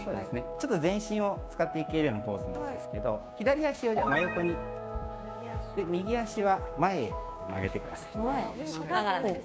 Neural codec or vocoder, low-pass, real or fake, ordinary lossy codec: codec, 16 kHz, 6 kbps, DAC; none; fake; none